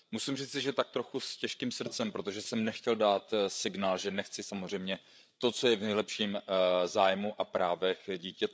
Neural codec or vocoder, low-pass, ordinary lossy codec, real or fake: codec, 16 kHz, 8 kbps, FreqCodec, larger model; none; none; fake